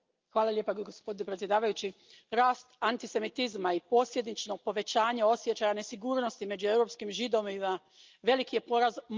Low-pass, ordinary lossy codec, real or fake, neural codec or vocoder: 7.2 kHz; Opus, 24 kbps; real; none